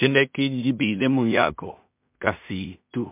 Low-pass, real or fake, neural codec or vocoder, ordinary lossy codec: 3.6 kHz; fake; codec, 16 kHz in and 24 kHz out, 0.4 kbps, LongCat-Audio-Codec, two codebook decoder; MP3, 32 kbps